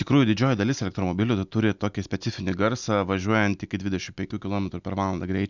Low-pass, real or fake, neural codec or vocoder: 7.2 kHz; real; none